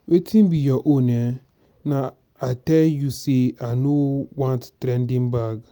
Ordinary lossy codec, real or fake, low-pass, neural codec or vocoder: none; real; none; none